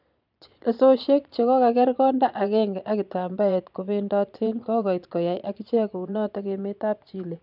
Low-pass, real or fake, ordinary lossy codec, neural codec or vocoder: 5.4 kHz; fake; none; vocoder, 22.05 kHz, 80 mel bands, Vocos